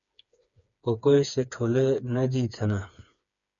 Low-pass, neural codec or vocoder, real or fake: 7.2 kHz; codec, 16 kHz, 4 kbps, FreqCodec, smaller model; fake